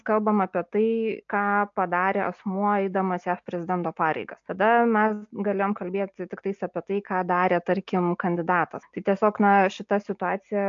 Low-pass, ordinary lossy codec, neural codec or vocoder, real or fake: 7.2 kHz; AAC, 64 kbps; none; real